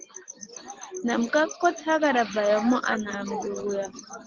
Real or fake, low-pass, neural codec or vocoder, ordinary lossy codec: real; 7.2 kHz; none; Opus, 16 kbps